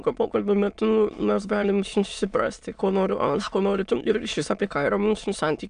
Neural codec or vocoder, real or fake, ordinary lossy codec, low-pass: autoencoder, 22.05 kHz, a latent of 192 numbers a frame, VITS, trained on many speakers; fake; AAC, 96 kbps; 9.9 kHz